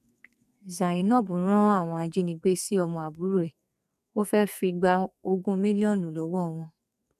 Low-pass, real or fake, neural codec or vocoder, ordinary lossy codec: 14.4 kHz; fake; codec, 44.1 kHz, 2.6 kbps, SNAC; none